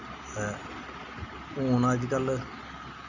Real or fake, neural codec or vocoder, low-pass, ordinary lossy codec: real; none; 7.2 kHz; none